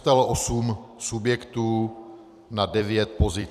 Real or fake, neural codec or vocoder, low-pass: fake; vocoder, 44.1 kHz, 128 mel bands every 256 samples, BigVGAN v2; 14.4 kHz